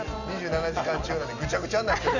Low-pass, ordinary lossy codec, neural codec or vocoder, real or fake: 7.2 kHz; none; none; real